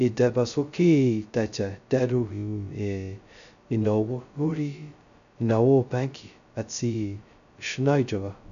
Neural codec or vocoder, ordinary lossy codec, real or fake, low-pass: codec, 16 kHz, 0.2 kbps, FocalCodec; none; fake; 7.2 kHz